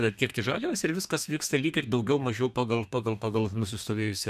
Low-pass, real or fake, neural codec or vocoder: 14.4 kHz; fake; codec, 32 kHz, 1.9 kbps, SNAC